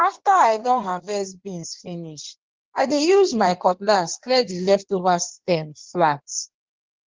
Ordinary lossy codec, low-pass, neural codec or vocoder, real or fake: Opus, 16 kbps; 7.2 kHz; codec, 16 kHz in and 24 kHz out, 1.1 kbps, FireRedTTS-2 codec; fake